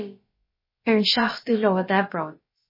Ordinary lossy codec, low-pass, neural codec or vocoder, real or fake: MP3, 24 kbps; 5.4 kHz; codec, 16 kHz, about 1 kbps, DyCAST, with the encoder's durations; fake